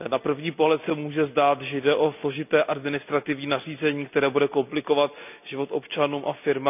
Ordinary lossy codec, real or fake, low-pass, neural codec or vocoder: none; real; 3.6 kHz; none